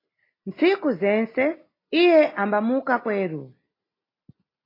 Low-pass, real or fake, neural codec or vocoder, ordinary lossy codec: 5.4 kHz; real; none; AAC, 24 kbps